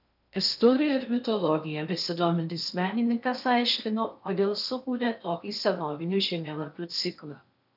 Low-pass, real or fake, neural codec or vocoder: 5.4 kHz; fake; codec, 16 kHz in and 24 kHz out, 0.8 kbps, FocalCodec, streaming, 65536 codes